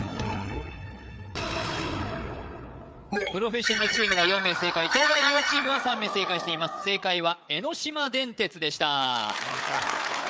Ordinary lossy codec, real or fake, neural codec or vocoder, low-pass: none; fake; codec, 16 kHz, 4 kbps, FreqCodec, larger model; none